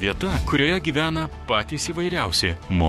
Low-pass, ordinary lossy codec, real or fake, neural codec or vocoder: 19.8 kHz; MP3, 64 kbps; fake; codec, 44.1 kHz, 7.8 kbps, DAC